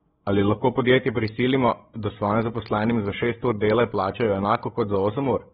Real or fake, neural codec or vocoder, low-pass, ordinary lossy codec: fake; codec, 16 kHz, 16 kbps, FreqCodec, larger model; 7.2 kHz; AAC, 16 kbps